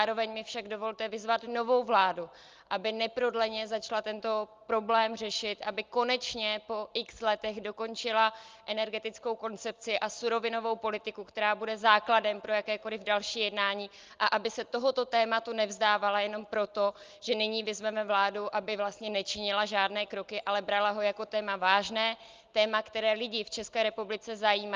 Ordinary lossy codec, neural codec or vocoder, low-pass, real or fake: Opus, 32 kbps; none; 7.2 kHz; real